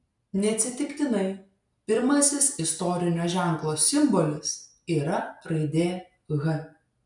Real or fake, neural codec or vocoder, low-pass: real; none; 10.8 kHz